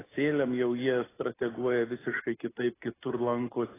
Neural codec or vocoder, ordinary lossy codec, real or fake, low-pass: none; AAC, 16 kbps; real; 3.6 kHz